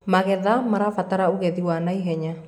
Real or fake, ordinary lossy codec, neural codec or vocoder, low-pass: real; none; none; 19.8 kHz